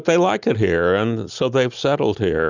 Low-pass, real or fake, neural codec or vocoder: 7.2 kHz; real; none